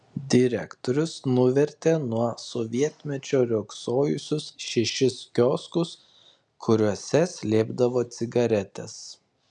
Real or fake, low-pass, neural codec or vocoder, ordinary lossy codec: real; 10.8 kHz; none; MP3, 96 kbps